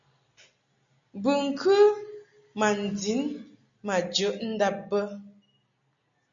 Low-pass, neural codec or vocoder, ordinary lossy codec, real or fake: 7.2 kHz; none; MP3, 64 kbps; real